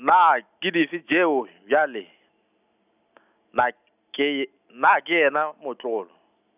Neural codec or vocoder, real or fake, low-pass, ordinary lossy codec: none; real; 3.6 kHz; none